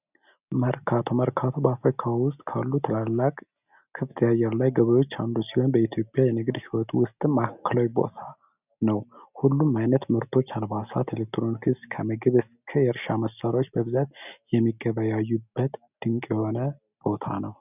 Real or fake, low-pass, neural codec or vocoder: real; 3.6 kHz; none